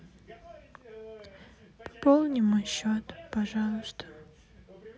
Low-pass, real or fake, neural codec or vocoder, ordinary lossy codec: none; real; none; none